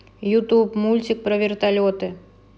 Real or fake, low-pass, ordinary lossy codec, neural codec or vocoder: real; none; none; none